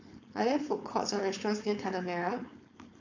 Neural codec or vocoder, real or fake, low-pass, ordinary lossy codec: codec, 16 kHz, 4.8 kbps, FACodec; fake; 7.2 kHz; AAC, 48 kbps